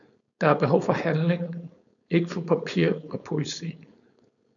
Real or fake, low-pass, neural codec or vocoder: fake; 7.2 kHz; codec, 16 kHz, 4.8 kbps, FACodec